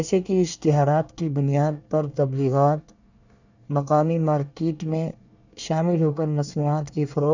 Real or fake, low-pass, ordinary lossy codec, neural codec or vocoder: fake; 7.2 kHz; none; codec, 24 kHz, 1 kbps, SNAC